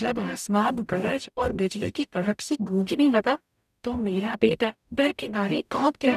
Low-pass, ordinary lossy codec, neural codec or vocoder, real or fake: 14.4 kHz; none; codec, 44.1 kHz, 0.9 kbps, DAC; fake